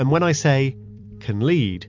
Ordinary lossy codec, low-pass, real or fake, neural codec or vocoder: MP3, 64 kbps; 7.2 kHz; fake; vocoder, 44.1 kHz, 128 mel bands every 256 samples, BigVGAN v2